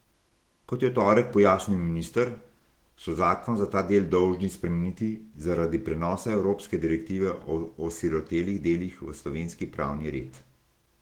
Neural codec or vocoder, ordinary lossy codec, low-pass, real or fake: autoencoder, 48 kHz, 128 numbers a frame, DAC-VAE, trained on Japanese speech; Opus, 16 kbps; 19.8 kHz; fake